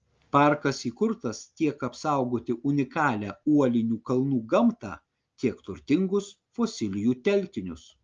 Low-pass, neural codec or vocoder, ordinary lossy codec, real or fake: 7.2 kHz; none; Opus, 24 kbps; real